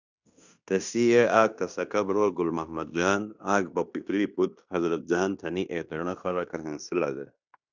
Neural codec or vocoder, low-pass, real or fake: codec, 16 kHz in and 24 kHz out, 0.9 kbps, LongCat-Audio-Codec, fine tuned four codebook decoder; 7.2 kHz; fake